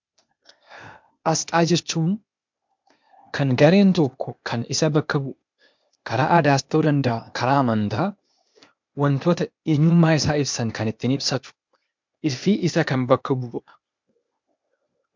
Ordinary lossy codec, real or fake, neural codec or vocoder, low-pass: MP3, 64 kbps; fake; codec, 16 kHz, 0.8 kbps, ZipCodec; 7.2 kHz